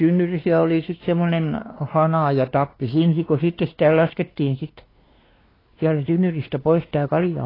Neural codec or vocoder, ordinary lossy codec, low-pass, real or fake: codec, 16 kHz, 2 kbps, X-Codec, WavLM features, trained on Multilingual LibriSpeech; AAC, 24 kbps; 5.4 kHz; fake